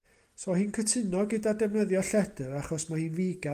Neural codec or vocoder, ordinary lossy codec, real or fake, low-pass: none; Opus, 32 kbps; real; 14.4 kHz